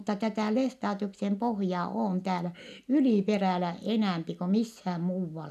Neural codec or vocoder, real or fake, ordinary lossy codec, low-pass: none; real; none; 14.4 kHz